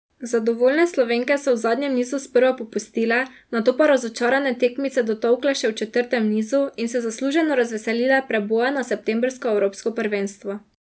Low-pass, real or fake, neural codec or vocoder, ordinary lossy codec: none; real; none; none